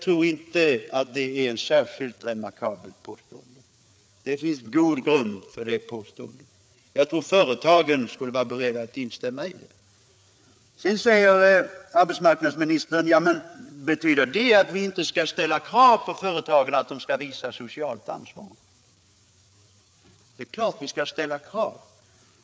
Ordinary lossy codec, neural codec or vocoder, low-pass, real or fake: none; codec, 16 kHz, 4 kbps, FreqCodec, larger model; none; fake